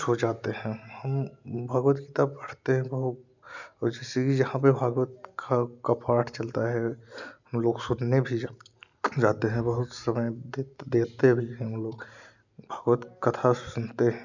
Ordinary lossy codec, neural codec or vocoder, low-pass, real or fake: none; none; 7.2 kHz; real